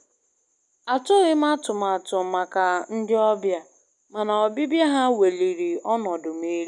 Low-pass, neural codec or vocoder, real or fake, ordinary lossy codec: 10.8 kHz; none; real; MP3, 96 kbps